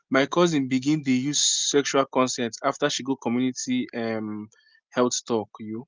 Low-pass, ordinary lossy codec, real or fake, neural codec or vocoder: 7.2 kHz; Opus, 24 kbps; real; none